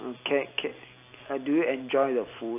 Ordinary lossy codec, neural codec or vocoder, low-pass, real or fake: AAC, 24 kbps; none; 3.6 kHz; real